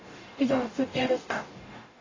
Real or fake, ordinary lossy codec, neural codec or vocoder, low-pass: fake; AAC, 32 kbps; codec, 44.1 kHz, 0.9 kbps, DAC; 7.2 kHz